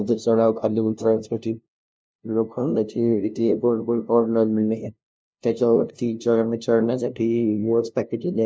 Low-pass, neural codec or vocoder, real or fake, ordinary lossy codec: none; codec, 16 kHz, 0.5 kbps, FunCodec, trained on LibriTTS, 25 frames a second; fake; none